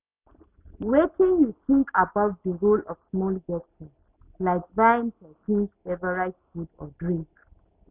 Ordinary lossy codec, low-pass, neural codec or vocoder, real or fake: none; 3.6 kHz; none; real